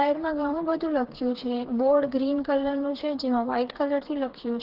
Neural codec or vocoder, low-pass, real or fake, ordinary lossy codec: codec, 16 kHz, 4 kbps, FreqCodec, smaller model; 5.4 kHz; fake; Opus, 16 kbps